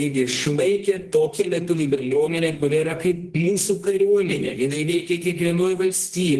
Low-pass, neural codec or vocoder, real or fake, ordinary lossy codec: 10.8 kHz; codec, 24 kHz, 0.9 kbps, WavTokenizer, medium music audio release; fake; Opus, 16 kbps